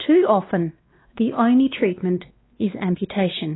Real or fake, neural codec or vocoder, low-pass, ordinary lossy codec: fake; codec, 16 kHz, 2 kbps, X-Codec, WavLM features, trained on Multilingual LibriSpeech; 7.2 kHz; AAC, 16 kbps